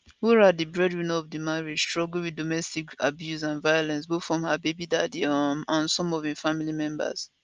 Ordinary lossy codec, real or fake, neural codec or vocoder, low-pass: Opus, 24 kbps; real; none; 7.2 kHz